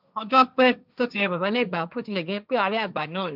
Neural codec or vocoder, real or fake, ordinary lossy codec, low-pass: codec, 16 kHz, 1.1 kbps, Voila-Tokenizer; fake; none; 5.4 kHz